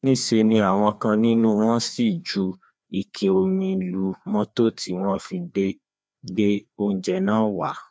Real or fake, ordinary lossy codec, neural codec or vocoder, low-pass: fake; none; codec, 16 kHz, 2 kbps, FreqCodec, larger model; none